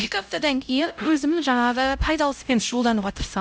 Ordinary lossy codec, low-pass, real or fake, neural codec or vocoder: none; none; fake; codec, 16 kHz, 0.5 kbps, X-Codec, HuBERT features, trained on LibriSpeech